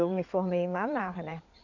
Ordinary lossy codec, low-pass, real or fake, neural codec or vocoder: none; 7.2 kHz; fake; codec, 16 kHz in and 24 kHz out, 2.2 kbps, FireRedTTS-2 codec